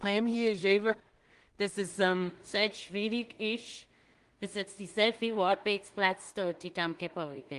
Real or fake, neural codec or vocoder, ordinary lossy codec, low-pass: fake; codec, 16 kHz in and 24 kHz out, 0.4 kbps, LongCat-Audio-Codec, two codebook decoder; Opus, 24 kbps; 10.8 kHz